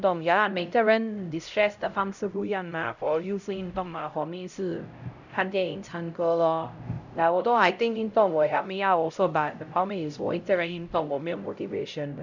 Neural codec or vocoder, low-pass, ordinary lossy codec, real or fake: codec, 16 kHz, 0.5 kbps, X-Codec, HuBERT features, trained on LibriSpeech; 7.2 kHz; none; fake